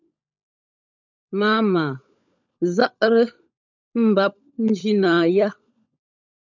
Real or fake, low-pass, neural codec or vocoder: fake; 7.2 kHz; codec, 16 kHz, 16 kbps, FunCodec, trained on LibriTTS, 50 frames a second